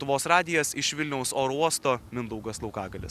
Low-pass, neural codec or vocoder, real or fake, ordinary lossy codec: 14.4 kHz; none; real; Opus, 64 kbps